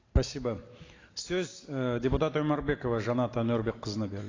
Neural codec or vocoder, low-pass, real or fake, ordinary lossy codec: none; 7.2 kHz; real; AAC, 32 kbps